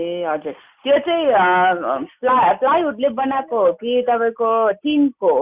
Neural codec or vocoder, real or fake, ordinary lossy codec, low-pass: none; real; Opus, 64 kbps; 3.6 kHz